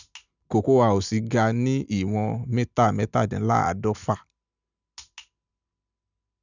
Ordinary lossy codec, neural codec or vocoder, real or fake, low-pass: none; none; real; 7.2 kHz